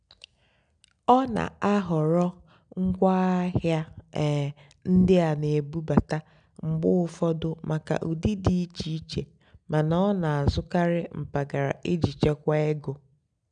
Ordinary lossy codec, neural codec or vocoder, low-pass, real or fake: none; none; 9.9 kHz; real